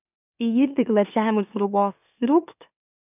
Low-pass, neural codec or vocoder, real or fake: 3.6 kHz; autoencoder, 44.1 kHz, a latent of 192 numbers a frame, MeloTTS; fake